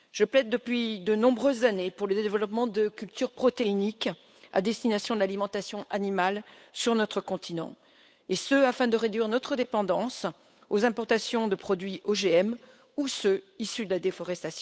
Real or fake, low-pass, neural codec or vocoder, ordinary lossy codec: fake; none; codec, 16 kHz, 8 kbps, FunCodec, trained on Chinese and English, 25 frames a second; none